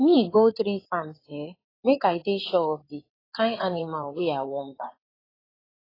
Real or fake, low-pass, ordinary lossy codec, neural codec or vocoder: fake; 5.4 kHz; AAC, 24 kbps; codec, 16 kHz in and 24 kHz out, 2.2 kbps, FireRedTTS-2 codec